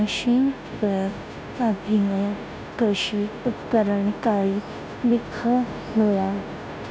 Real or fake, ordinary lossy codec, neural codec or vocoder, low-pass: fake; none; codec, 16 kHz, 0.5 kbps, FunCodec, trained on Chinese and English, 25 frames a second; none